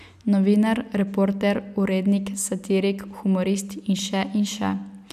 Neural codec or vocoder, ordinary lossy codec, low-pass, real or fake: none; none; 14.4 kHz; real